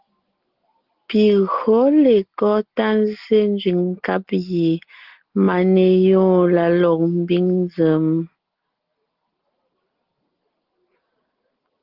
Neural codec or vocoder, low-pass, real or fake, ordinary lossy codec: none; 5.4 kHz; real; Opus, 16 kbps